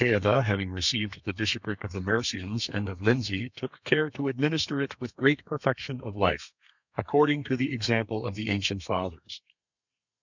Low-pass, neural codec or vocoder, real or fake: 7.2 kHz; codec, 32 kHz, 1.9 kbps, SNAC; fake